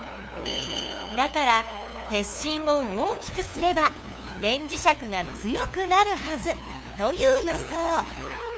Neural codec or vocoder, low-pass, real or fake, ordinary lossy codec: codec, 16 kHz, 2 kbps, FunCodec, trained on LibriTTS, 25 frames a second; none; fake; none